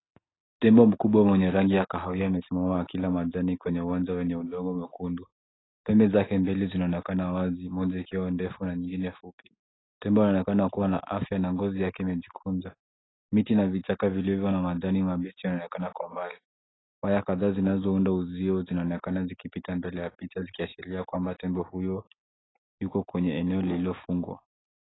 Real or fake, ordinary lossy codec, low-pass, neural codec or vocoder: real; AAC, 16 kbps; 7.2 kHz; none